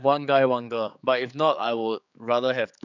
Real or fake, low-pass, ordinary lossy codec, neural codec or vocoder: fake; 7.2 kHz; none; codec, 16 kHz, 4 kbps, X-Codec, HuBERT features, trained on general audio